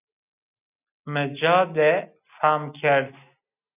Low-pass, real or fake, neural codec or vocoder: 3.6 kHz; real; none